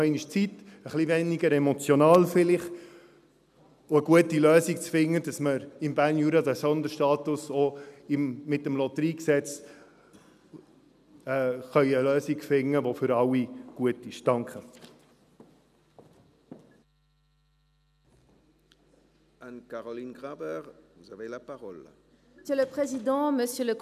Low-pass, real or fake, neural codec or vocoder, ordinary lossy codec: 14.4 kHz; real; none; none